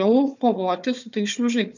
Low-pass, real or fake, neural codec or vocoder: 7.2 kHz; fake; codec, 16 kHz, 4 kbps, FunCodec, trained on Chinese and English, 50 frames a second